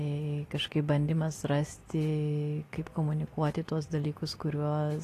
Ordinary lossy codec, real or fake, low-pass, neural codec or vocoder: AAC, 48 kbps; real; 14.4 kHz; none